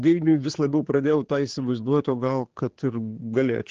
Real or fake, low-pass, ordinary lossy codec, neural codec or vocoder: fake; 7.2 kHz; Opus, 16 kbps; codec, 16 kHz, 4 kbps, X-Codec, HuBERT features, trained on general audio